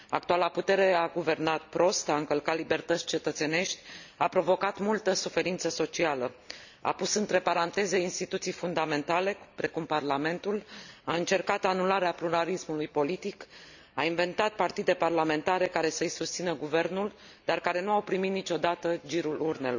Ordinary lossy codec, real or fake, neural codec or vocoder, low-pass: none; real; none; 7.2 kHz